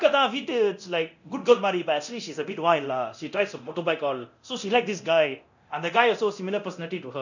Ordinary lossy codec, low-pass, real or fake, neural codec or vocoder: none; 7.2 kHz; fake; codec, 24 kHz, 0.9 kbps, DualCodec